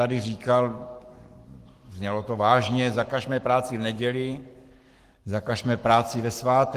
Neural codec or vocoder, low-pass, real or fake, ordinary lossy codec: codec, 44.1 kHz, 7.8 kbps, Pupu-Codec; 14.4 kHz; fake; Opus, 24 kbps